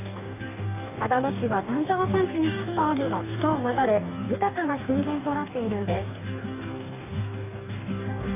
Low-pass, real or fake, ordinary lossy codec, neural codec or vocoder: 3.6 kHz; fake; none; codec, 44.1 kHz, 2.6 kbps, DAC